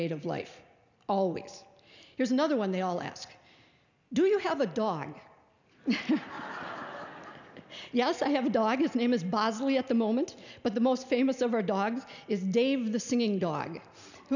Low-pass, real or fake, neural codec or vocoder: 7.2 kHz; real; none